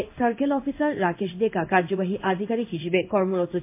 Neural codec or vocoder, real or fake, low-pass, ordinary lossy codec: codec, 16 kHz in and 24 kHz out, 1 kbps, XY-Tokenizer; fake; 3.6 kHz; MP3, 24 kbps